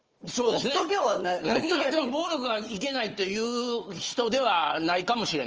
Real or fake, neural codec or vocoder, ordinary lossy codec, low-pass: fake; codec, 16 kHz, 4 kbps, FunCodec, trained on Chinese and English, 50 frames a second; Opus, 24 kbps; 7.2 kHz